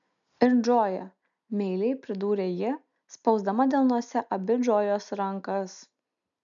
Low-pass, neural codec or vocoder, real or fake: 7.2 kHz; none; real